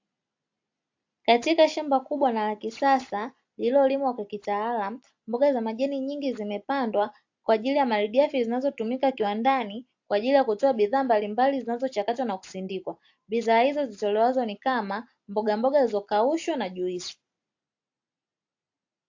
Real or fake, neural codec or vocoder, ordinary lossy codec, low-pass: real; none; AAC, 48 kbps; 7.2 kHz